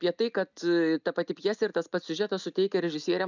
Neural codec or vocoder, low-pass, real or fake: none; 7.2 kHz; real